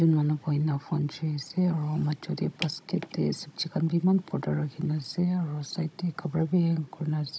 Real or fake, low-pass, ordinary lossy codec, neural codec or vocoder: fake; none; none; codec, 16 kHz, 16 kbps, FunCodec, trained on Chinese and English, 50 frames a second